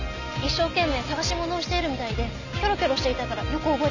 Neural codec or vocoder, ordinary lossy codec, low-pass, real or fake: none; none; 7.2 kHz; real